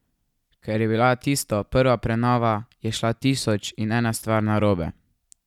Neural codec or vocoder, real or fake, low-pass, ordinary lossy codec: none; real; 19.8 kHz; none